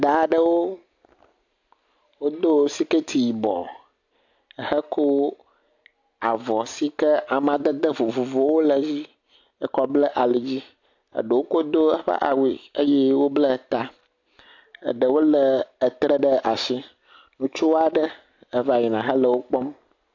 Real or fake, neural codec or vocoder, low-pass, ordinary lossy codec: real; none; 7.2 kHz; AAC, 48 kbps